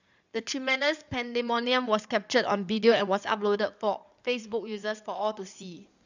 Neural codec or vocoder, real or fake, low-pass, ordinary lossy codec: codec, 16 kHz in and 24 kHz out, 2.2 kbps, FireRedTTS-2 codec; fake; 7.2 kHz; none